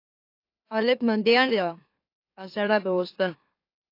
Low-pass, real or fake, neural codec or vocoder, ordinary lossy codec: 5.4 kHz; fake; autoencoder, 44.1 kHz, a latent of 192 numbers a frame, MeloTTS; AAC, 32 kbps